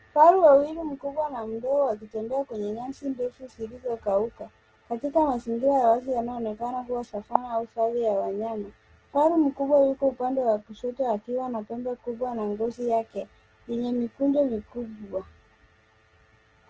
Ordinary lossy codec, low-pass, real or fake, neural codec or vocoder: Opus, 16 kbps; 7.2 kHz; real; none